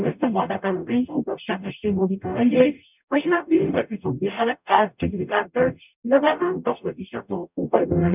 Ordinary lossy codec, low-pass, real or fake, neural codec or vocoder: none; 3.6 kHz; fake; codec, 44.1 kHz, 0.9 kbps, DAC